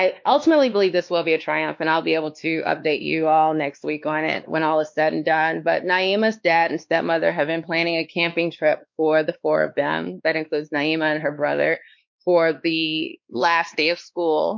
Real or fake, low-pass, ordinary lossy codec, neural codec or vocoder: fake; 7.2 kHz; MP3, 48 kbps; codec, 16 kHz, 2 kbps, X-Codec, WavLM features, trained on Multilingual LibriSpeech